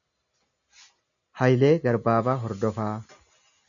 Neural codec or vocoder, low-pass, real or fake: none; 7.2 kHz; real